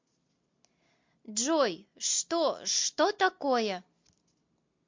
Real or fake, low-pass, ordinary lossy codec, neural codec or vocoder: real; 7.2 kHz; MP3, 48 kbps; none